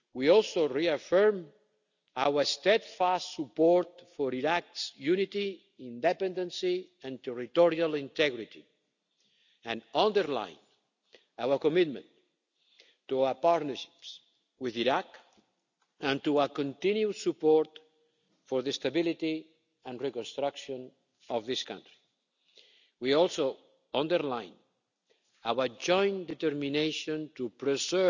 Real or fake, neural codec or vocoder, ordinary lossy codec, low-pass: real; none; none; 7.2 kHz